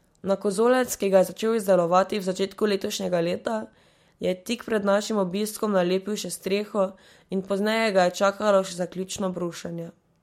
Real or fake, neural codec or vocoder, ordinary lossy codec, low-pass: real; none; MP3, 64 kbps; 19.8 kHz